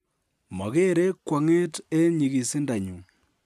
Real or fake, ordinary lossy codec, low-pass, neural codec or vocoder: real; none; 14.4 kHz; none